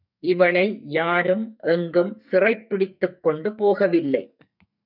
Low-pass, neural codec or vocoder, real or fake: 5.4 kHz; codec, 44.1 kHz, 2.6 kbps, SNAC; fake